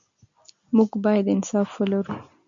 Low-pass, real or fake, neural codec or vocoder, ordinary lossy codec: 7.2 kHz; real; none; MP3, 64 kbps